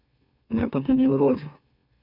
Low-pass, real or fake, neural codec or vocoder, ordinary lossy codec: 5.4 kHz; fake; autoencoder, 44.1 kHz, a latent of 192 numbers a frame, MeloTTS; none